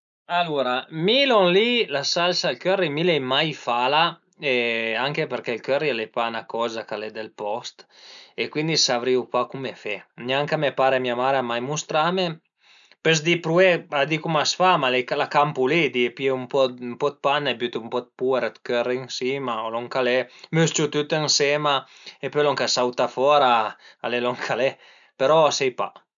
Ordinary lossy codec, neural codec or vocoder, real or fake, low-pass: none; none; real; 7.2 kHz